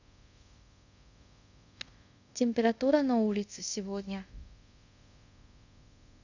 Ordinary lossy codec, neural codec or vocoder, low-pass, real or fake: none; codec, 24 kHz, 0.5 kbps, DualCodec; 7.2 kHz; fake